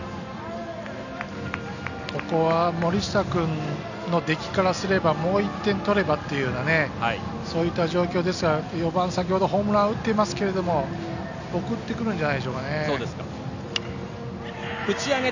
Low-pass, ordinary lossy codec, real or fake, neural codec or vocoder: 7.2 kHz; none; real; none